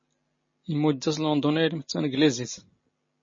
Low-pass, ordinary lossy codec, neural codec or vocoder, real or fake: 7.2 kHz; MP3, 32 kbps; none; real